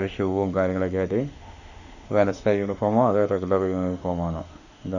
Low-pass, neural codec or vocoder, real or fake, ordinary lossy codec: 7.2 kHz; autoencoder, 48 kHz, 32 numbers a frame, DAC-VAE, trained on Japanese speech; fake; none